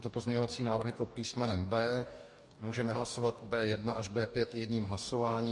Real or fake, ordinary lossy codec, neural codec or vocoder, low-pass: fake; MP3, 48 kbps; codec, 44.1 kHz, 2.6 kbps, DAC; 10.8 kHz